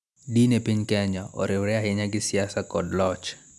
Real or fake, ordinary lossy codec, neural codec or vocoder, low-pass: real; none; none; none